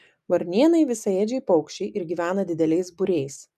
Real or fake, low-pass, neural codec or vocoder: real; 14.4 kHz; none